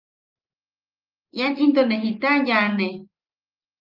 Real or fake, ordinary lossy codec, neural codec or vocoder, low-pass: real; Opus, 32 kbps; none; 5.4 kHz